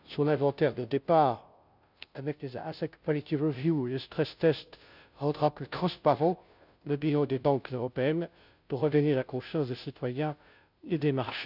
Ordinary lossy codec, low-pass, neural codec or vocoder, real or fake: none; 5.4 kHz; codec, 16 kHz, 0.5 kbps, FunCodec, trained on Chinese and English, 25 frames a second; fake